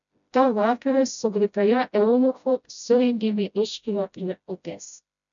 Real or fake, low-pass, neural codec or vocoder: fake; 7.2 kHz; codec, 16 kHz, 0.5 kbps, FreqCodec, smaller model